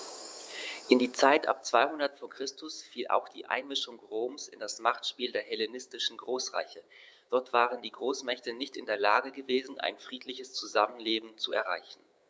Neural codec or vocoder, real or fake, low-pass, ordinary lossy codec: codec, 16 kHz, 6 kbps, DAC; fake; none; none